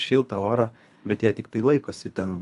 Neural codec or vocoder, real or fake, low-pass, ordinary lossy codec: codec, 24 kHz, 3 kbps, HILCodec; fake; 10.8 kHz; AAC, 64 kbps